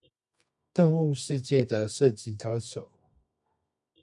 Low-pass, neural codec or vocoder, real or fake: 10.8 kHz; codec, 24 kHz, 0.9 kbps, WavTokenizer, medium music audio release; fake